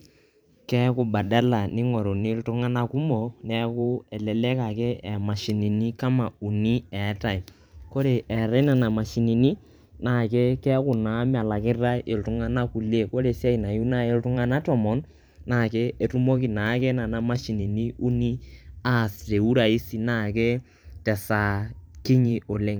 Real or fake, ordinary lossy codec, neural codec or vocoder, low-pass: fake; none; vocoder, 44.1 kHz, 128 mel bands every 512 samples, BigVGAN v2; none